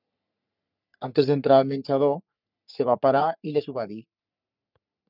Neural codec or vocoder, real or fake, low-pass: codec, 44.1 kHz, 3.4 kbps, Pupu-Codec; fake; 5.4 kHz